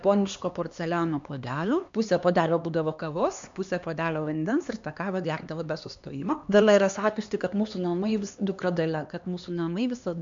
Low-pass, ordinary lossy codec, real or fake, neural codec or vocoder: 7.2 kHz; MP3, 64 kbps; fake; codec, 16 kHz, 2 kbps, X-Codec, HuBERT features, trained on LibriSpeech